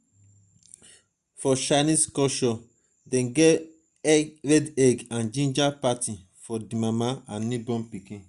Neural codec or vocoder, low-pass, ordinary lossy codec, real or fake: none; 10.8 kHz; Opus, 64 kbps; real